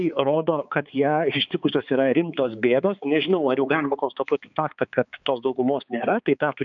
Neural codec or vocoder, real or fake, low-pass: codec, 16 kHz, 4 kbps, X-Codec, HuBERT features, trained on balanced general audio; fake; 7.2 kHz